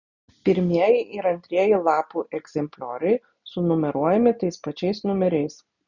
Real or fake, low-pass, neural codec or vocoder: real; 7.2 kHz; none